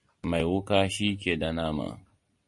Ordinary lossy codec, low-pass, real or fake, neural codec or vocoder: MP3, 48 kbps; 10.8 kHz; real; none